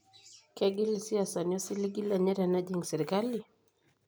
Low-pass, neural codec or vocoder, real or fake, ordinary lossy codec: none; none; real; none